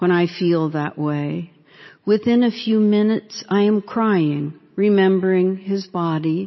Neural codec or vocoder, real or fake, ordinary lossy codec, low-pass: none; real; MP3, 24 kbps; 7.2 kHz